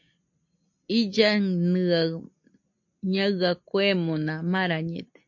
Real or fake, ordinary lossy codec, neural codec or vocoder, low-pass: real; MP3, 48 kbps; none; 7.2 kHz